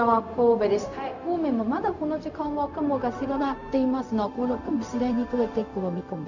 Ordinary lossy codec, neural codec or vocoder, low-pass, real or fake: none; codec, 16 kHz, 0.4 kbps, LongCat-Audio-Codec; 7.2 kHz; fake